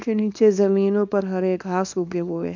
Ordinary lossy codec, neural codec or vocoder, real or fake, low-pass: none; codec, 24 kHz, 0.9 kbps, WavTokenizer, small release; fake; 7.2 kHz